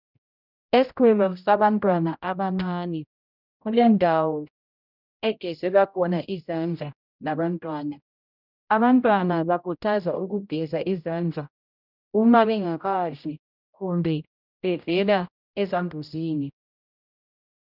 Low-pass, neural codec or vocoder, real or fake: 5.4 kHz; codec, 16 kHz, 0.5 kbps, X-Codec, HuBERT features, trained on general audio; fake